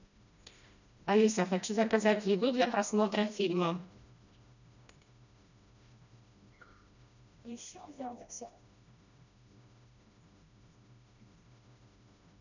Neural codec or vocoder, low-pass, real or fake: codec, 16 kHz, 1 kbps, FreqCodec, smaller model; 7.2 kHz; fake